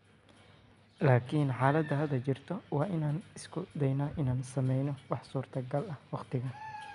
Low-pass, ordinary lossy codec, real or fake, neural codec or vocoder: 10.8 kHz; none; real; none